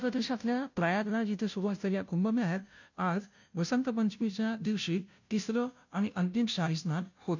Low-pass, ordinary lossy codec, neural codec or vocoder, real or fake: 7.2 kHz; none; codec, 16 kHz, 0.5 kbps, FunCodec, trained on Chinese and English, 25 frames a second; fake